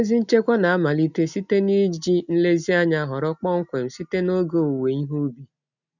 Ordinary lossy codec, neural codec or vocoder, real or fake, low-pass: none; none; real; 7.2 kHz